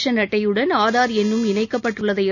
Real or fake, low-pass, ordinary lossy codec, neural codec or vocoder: real; 7.2 kHz; none; none